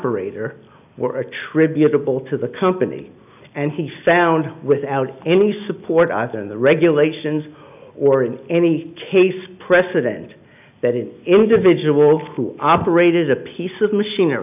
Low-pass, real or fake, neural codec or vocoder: 3.6 kHz; real; none